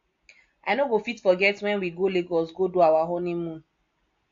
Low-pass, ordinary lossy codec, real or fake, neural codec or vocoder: 7.2 kHz; AAC, 48 kbps; real; none